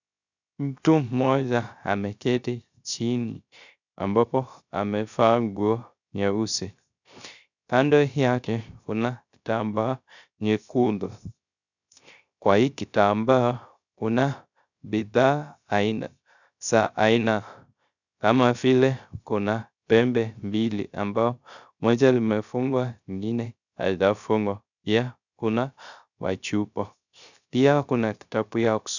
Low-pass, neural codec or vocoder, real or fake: 7.2 kHz; codec, 16 kHz, 0.3 kbps, FocalCodec; fake